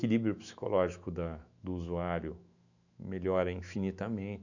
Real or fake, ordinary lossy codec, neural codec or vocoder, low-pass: fake; none; autoencoder, 48 kHz, 128 numbers a frame, DAC-VAE, trained on Japanese speech; 7.2 kHz